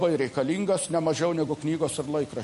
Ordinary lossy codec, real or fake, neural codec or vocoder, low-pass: MP3, 48 kbps; real; none; 14.4 kHz